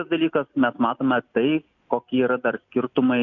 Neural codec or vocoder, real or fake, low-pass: none; real; 7.2 kHz